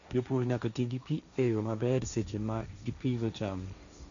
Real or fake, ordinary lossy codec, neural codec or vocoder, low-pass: fake; none; codec, 16 kHz, 1.1 kbps, Voila-Tokenizer; 7.2 kHz